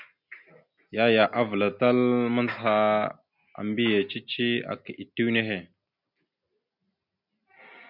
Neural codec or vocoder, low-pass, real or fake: none; 5.4 kHz; real